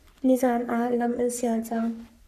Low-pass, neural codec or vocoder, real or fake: 14.4 kHz; codec, 44.1 kHz, 3.4 kbps, Pupu-Codec; fake